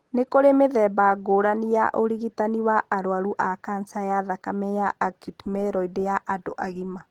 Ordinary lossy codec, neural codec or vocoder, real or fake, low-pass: Opus, 16 kbps; none; real; 19.8 kHz